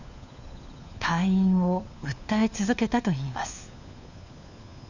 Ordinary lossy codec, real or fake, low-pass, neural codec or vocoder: none; fake; 7.2 kHz; codec, 16 kHz, 4 kbps, FunCodec, trained on LibriTTS, 50 frames a second